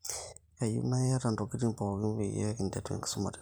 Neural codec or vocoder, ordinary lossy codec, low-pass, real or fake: vocoder, 44.1 kHz, 128 mel bands every 512 samples, BigVGAN v2; none; none; fake